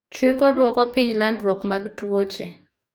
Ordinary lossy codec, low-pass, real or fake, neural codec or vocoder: none; none; fake; codec, 44.1 kHz, 2.6 kbps, DAC